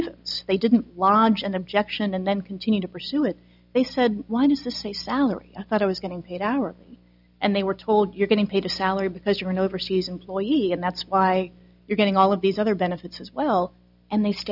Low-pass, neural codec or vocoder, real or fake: 5.4 kHz; none; real